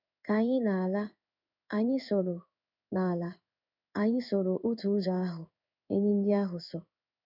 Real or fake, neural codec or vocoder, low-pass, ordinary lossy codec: fake; codec, 16 kHz in and 24 kHz out, 1 kbps, XY-Tokenizer; 5.4 kHz; none